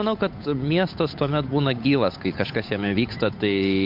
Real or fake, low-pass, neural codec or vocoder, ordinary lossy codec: fake; 5.4 kHz; codec, 16 kHz, 8 kbps, FunCodec, trained on Chinese and English, 25 frames a second; MP3, 48 kbps